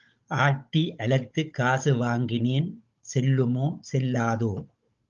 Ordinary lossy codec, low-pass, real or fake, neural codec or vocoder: Opus, 32 kbps; 7.2 kHz; fake; codec, 16 kHz, 16 kbps, FunCodec, trained on Chinese and English, 50 frames a second